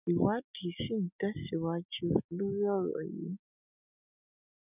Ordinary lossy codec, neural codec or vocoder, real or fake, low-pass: none; none; real; 3.6 kHz